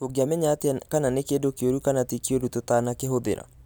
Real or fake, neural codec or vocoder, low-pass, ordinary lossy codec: real; none; none; none